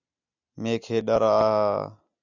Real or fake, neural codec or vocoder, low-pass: real; none; 7.2 kHz